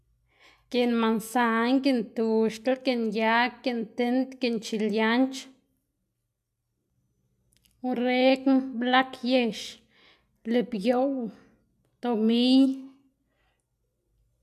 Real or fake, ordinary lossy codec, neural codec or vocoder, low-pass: real; MP3, 96 kbps; none; 14.4 kHz